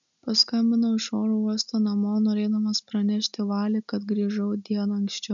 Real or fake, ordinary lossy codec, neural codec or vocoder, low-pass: real; MP3, 96 kbps; none; 7.2 kHz